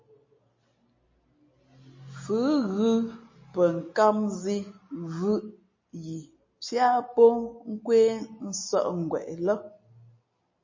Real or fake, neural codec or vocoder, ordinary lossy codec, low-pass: real; none; MP3, 32 kbps; 7.2 kHz